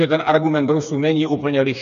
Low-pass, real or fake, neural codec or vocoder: 7.2 kHz; fake; codec, 16 kHz, 4 kbps, FreqCodec, smaller model